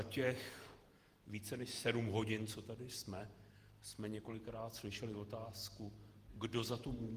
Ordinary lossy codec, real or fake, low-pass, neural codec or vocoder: Opus, 24 kbps; fake; 14.4 kHz; vocoder, 48 kHz, 128 mel bands, Vocos